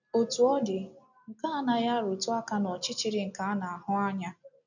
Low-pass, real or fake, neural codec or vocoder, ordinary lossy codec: 7.2 kHz; real; none; none